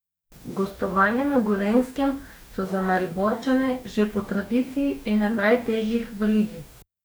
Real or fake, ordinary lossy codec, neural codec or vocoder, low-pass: fake; none; codec, 44.1 kHz, 2.6 kbps, DAC; none